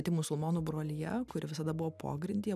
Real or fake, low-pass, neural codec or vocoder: real; 14.4 kHz; none